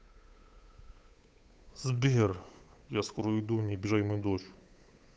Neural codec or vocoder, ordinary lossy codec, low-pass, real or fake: codec, 16 kHz, 8 kbps, FunCodec, trained on Chinese and English, 25 frames a second; none; none; fake